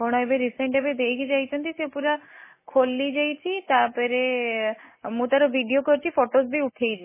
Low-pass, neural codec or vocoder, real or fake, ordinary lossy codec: 3.6 kHz; none; real; MP3, 16 kbps